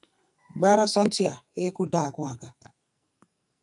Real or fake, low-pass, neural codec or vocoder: fake; 10.8 kHz; codec, 44.1 kHz, 2.6 kbps, SNAC